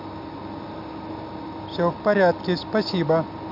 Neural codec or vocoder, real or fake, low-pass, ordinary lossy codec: none; real; 5.4 kHz; MP3, 48 kbps